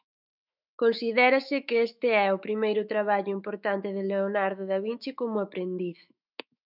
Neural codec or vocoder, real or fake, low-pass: autoencoder, 48 kHz, 128 numbers a frame, DAC-VAE, trained on Japanese speech; fake; 5.4 kHz